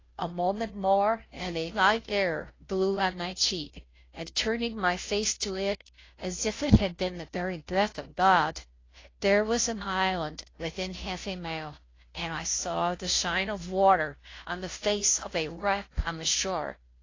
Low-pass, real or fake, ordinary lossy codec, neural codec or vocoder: 7.2 kHz; fake; AAC, 32 kbps; codec, 16 kHz, 0.5 kbps, FunCodec, trained on Chinese and English, 25 frames a second